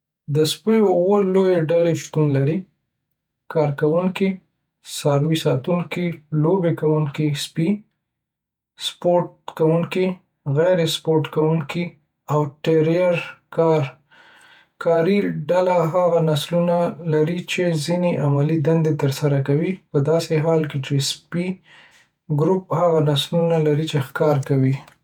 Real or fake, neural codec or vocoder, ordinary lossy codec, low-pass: fake; vocoder, 48 kHz, 128 mel bands, Vocos; none; 19.8 kHz